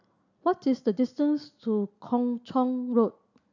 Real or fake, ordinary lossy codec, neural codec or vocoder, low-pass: real; none; none; 7.2 kHz